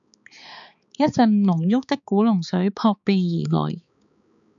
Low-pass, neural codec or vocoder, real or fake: 7.2 kHz; codec, 16 kHz, 4 kbps, X-Codec, HuBERT features, trained on balanced general audio; fake